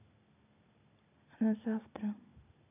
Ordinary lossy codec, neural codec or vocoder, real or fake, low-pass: none; none; real; 3.6 kHz